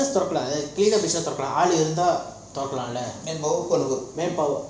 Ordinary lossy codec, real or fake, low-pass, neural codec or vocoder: none; real; none; none